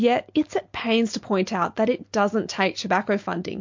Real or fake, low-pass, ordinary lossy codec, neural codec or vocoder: real; 7.2 kHz; MP3, 48 kbps; none